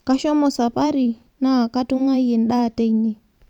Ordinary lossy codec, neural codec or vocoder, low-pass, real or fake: none; vocoder, 44.1 kHz, 128 mel bands every 512 samples, BigVGAN v2; 19.8 kHz; fake